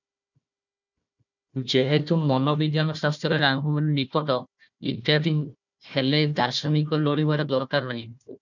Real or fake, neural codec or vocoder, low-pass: fake; codec, 16 kHz, 1 kbps, FunCodec, trained on Chinese and English, 50 frames a second; 7.2 kHz